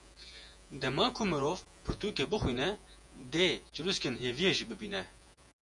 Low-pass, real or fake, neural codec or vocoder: 10.8 kHz; fake; vocoder, 48 kHz, 128 mel bands, Vocos